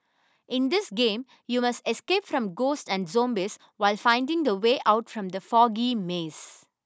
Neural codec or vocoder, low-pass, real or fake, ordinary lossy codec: none; none; real; none